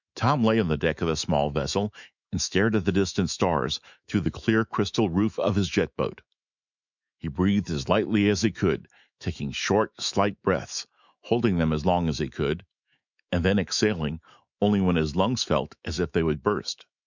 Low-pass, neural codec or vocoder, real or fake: 7.2 kHz; autoencoder, 48 kHz, 128 numbers a frame, DAC-VAE, trained on Japanese speech; fake